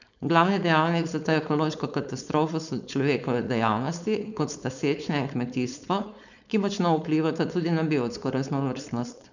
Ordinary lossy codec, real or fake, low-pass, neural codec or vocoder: none; fake; 7.2 kHz; codec, 16 kHz, 4.8 kbps, FACodec